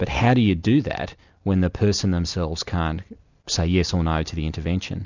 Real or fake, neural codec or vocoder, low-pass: real; none; 7.2 kHz